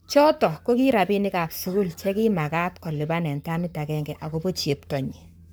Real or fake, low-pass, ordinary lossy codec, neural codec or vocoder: fake; none; none; codec, 44.1 kHz, 7.8 kbps, Pupu-Codec